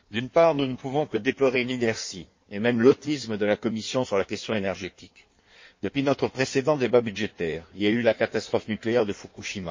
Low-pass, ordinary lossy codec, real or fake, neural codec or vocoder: 7.2 kHz; MP3, 32 kbps; fake; codec, 16 kHz in and 24 kHz out, 1.1 kbps, FireRedTTS-2 codec